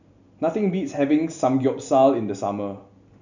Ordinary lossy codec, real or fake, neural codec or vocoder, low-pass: none; real; none; 7.2 kHz